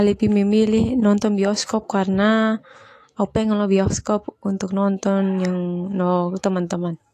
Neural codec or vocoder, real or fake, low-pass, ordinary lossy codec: autoencoder, 48 kHz, 128 numbers a frame, DAC-VAE, trained on Japanese speech; fake; 14.4 kHz; AAC, 48 kbps